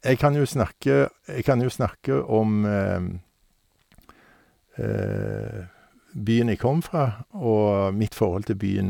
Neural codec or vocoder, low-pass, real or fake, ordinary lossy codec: none; 19.8 kHz; real; none